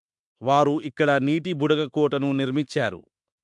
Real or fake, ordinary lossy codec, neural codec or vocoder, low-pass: fake; MP3, 64 kbps; autoencoder, 48 kHz, 32 numbers a frame, DAC-VAE, trained on Japanese speech; 10.8 kHz